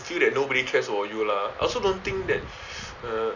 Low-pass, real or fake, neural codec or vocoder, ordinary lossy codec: 7.2 kHz; real; none; none